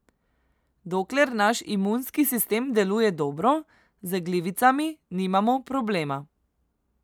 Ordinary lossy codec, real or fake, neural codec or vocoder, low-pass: none; real; none; none